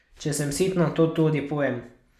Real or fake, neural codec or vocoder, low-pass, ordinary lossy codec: real; none; 14.4 kHz; none